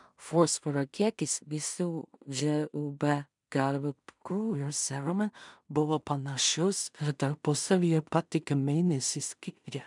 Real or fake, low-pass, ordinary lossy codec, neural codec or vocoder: fake; 10.8 kHz; MP3, 96 kbps; codec, 16 kHz in and 24 kHz out, 0.4 kbps, LongCat-Audio-Codec, two codebook decoder